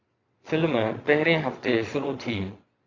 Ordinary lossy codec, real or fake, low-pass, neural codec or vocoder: AAC, 48 kbps; fake; 7.2 kHz; vocoder, 22.05 kHz, 80 mel bands, Vocos